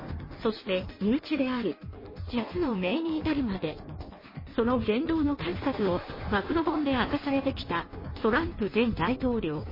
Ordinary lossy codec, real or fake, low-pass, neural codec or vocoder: MP3, 24 kbps; fake; 5.4 kHz; codec, 16 kHz in and 24 kHz out, 1.1 kbps, FireRedTTS-2 codec